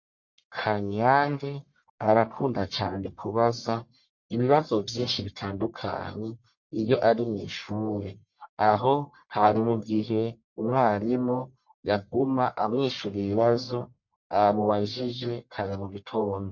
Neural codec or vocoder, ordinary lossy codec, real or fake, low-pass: codec, 44.1 kHz, 1.7 kbps, Pupu-Codec; MP3, 48 kbps; fake; 7.2 kHz